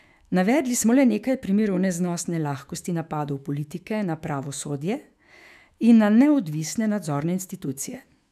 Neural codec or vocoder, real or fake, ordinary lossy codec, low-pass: autoencoder, 48 kHz, 128 numbers a frame, DAC-VAE, trained on Japanese speech; fake; none; 14.4 kHz